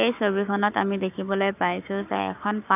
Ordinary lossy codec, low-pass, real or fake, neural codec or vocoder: none; 3.6 kHz; fake; codec, 44.1 kHz, 7.8 kbps, Pupu-Codec